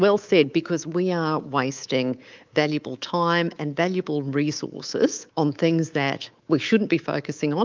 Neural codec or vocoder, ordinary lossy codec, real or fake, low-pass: codec, 24 kHz, 3.1 kbps, DualCodec; Opus, 24 kbps; fake; 7.2 kHz